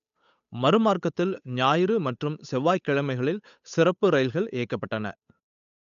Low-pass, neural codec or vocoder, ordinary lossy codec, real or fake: 7.2 kHz; codec, 16 kHz, 8 kbps, FunCodec, trained on Chinese and English, 25 frames a second; none; fake